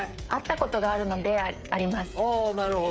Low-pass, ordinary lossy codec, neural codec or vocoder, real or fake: none; none; codec, 16 kHz, 8 kbps, FreqCodec, smaller model; fake